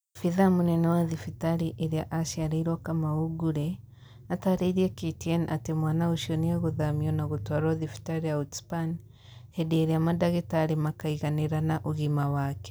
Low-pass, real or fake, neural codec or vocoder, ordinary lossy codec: none; real; none; none